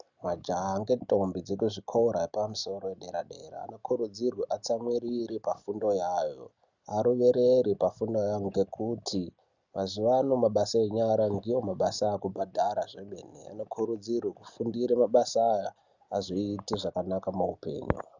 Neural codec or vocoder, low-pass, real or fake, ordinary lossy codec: none; 7.2 kHz; real; Opus, 64 kbps